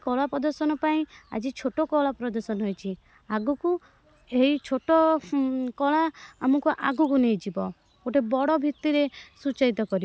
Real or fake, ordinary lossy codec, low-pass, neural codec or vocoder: real; none; none; none